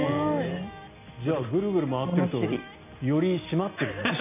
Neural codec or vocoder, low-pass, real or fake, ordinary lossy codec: none; 3.6 kHz; real; none